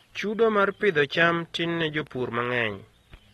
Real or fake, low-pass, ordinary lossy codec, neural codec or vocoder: real; 14.4 kHz; AAC, 32 kbps; none